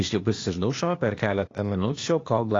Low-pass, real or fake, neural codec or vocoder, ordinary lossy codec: 7.2 kHz; fake; codec, 16 kHz, 0.8 kbps, ZipCodec; AAC, 32 kbps